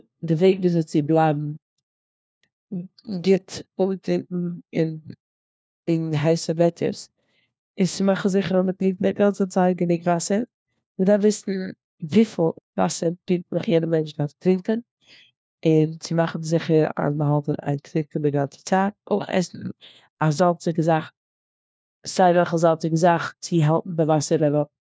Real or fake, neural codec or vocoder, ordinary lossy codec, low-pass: fake; codec, 16 kHz, 1 kbps, FunCodec, trained on LibriTTS, 50 frames a second; none; none